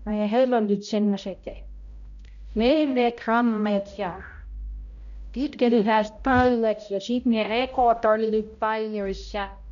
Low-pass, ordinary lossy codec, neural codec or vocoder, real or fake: 7.2 kHz; none; codec, 16 kHz, 0.5 kbps, X-Codec, HuBERT features, trained on balanced general audio; fake